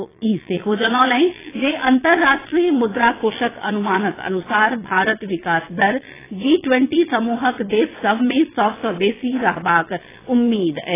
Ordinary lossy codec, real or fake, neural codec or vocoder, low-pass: AAC, 16 kbps; fake; vocoder, 22.05 kHz, 80 mel bands, Vocos; 3.6 kHz